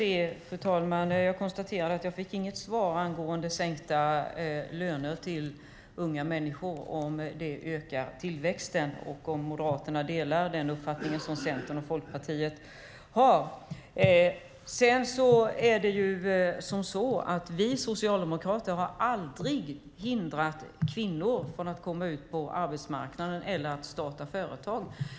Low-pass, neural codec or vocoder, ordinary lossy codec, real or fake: none; none; none; real